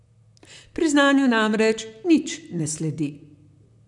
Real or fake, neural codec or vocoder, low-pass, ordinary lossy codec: fake; vocoder, 44.1 kHz, 128 mel bands, Pupu-Vocoder; 10.8 kHz; none